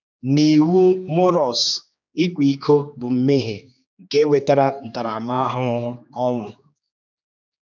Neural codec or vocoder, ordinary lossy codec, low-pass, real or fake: codec, 16 kHz, 2 kbps, X-Codec, HuBERT features, trained on general audio; none; 7.2 kHz; fake